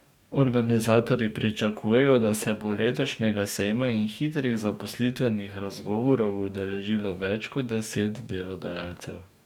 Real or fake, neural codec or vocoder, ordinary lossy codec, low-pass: fake; codec, 44.1 kHz, 2.6 kbps, DAC; none; 19.8 kHz